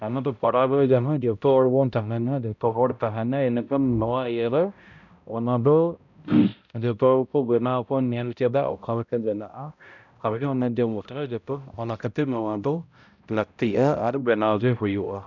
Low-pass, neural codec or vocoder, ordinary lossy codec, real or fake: 7.2 kHz; codec, 16 kHz, 0.5 kbps, X-Codec, HuBERT features, trained on balanced general audio; none; fake